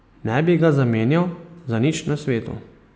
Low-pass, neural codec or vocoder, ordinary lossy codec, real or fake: none; none; none; real